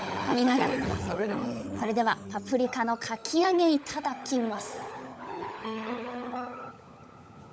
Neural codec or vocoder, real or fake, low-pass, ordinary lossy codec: codec, 16 kHz, 16 kbps, FunCodec, trained on LibriTTS, 50 frames a second; fake; none; none